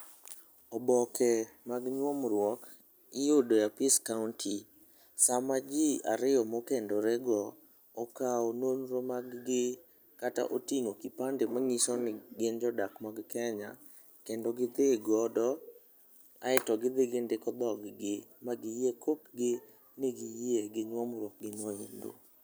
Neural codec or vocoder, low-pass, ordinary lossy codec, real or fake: none; none; none; real